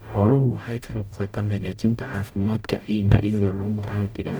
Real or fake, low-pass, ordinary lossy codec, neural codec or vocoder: fake; none; none; codec, 44.1 kHz, 0.9 kbps, DAC